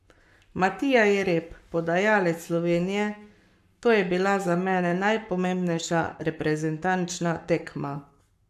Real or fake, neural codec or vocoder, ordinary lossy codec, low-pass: fake; codec, 44.1 kHz, 7.8 kbps, Pupu-Codec; AAC, 96 kbps; 14.4 kHz